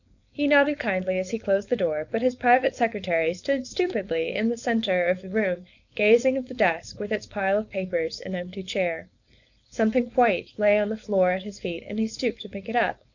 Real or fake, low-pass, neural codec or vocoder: fake; 7.2 kHz; codec, 16 kHz, 4.8 kbps, FACodec